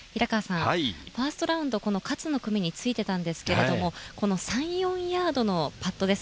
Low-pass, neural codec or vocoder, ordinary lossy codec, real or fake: none; none; none; real